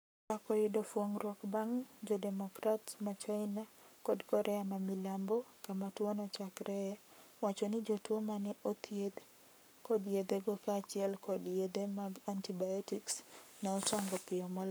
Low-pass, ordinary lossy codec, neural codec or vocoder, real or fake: none; none; codec, 44.1 kHz, 7.8 kbps, Pupu-Codec; fake